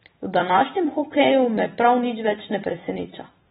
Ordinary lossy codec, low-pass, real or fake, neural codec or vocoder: AAC, 16 kbps; 19.8 kHz; fake; vocoder, 44.1 kHz, 128 mel bands every 256 samples, BigVGAN v2